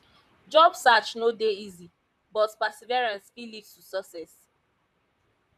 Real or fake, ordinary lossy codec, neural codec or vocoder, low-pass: fake; none; vocoder, 44.1 kHz, 128 mel bands, Pupu-Vocoder; 14.4 kHz